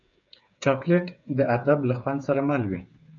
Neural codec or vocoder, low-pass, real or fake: codec, 16 kHz, 8 kbps, FreqCodec, smaller model; 7.2 kHz; fake